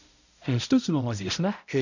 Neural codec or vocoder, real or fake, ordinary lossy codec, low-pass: codec, 16 kHz, 0.5 kbps, X-Codec, HuBERT features, trained on balanced general audio; fake; none; 7.2 kHz